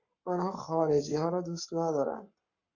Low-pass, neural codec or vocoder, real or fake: 7.2 kHz; codec, 44.1 kHz, 7.8 kbps, Pupu-Codec; fake